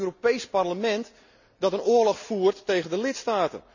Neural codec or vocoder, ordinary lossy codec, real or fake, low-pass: none; none; real; 7.2 kHz